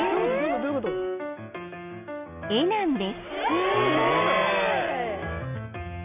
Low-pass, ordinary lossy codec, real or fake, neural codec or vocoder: 3.6 kHz; none; real; none